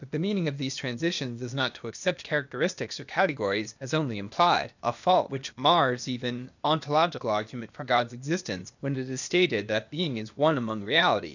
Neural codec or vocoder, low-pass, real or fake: codec, 16 kHz, 0.8 kbps, ZipCodec; 7.2 kHz; fake